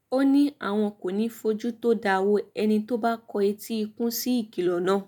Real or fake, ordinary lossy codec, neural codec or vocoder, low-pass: real; none; none; none